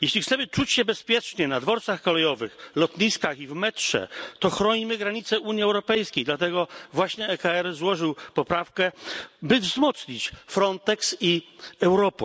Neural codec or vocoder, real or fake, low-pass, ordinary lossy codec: none; real; none; none